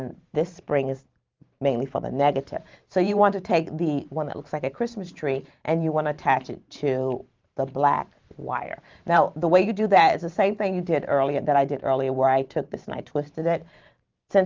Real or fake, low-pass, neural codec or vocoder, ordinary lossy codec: fake; 7.2 kHz; vocoder, 44.1 kHz, 128 mel bands every 512 samples, BigVGAN v2; Opus, 32 kbps